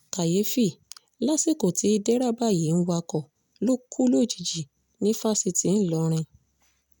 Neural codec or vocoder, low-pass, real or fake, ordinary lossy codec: none; none; real; none